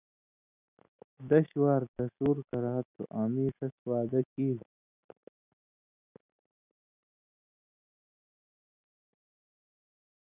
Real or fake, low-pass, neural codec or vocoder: real; 3.6 kHz; none